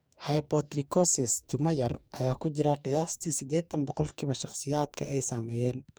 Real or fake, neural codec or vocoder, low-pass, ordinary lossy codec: fake; codec, 44.1 kHz, 2.6 kbps, DAC; none; none